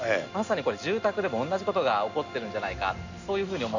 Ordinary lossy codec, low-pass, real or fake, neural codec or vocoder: none; 7.2 kHz; real; none